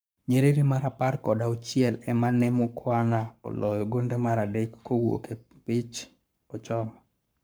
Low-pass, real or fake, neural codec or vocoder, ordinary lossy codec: none; fake; codec, 44.1 kHz, 7.8 kbps, Pupu-Codec; none